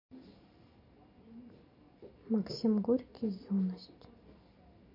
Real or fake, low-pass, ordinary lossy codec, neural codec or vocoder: real; 5.4 kHz; MP3, 32 kbps; none